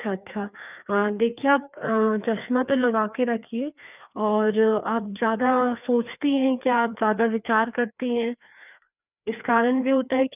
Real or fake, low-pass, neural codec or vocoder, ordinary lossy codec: fake; 3.6 kHz; codec, 16 kHz, 2 kbps, FreqCodec, larger model; none